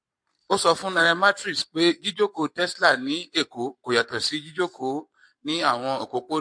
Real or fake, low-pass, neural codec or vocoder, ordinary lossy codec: fake; 9.9 kHz; codec, 44.1 kHz, 7.8 kbps, Pupu-Codec; MP3, 48 kbps